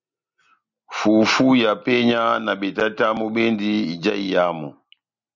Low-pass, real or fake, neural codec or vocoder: 7.2 kHz; real; none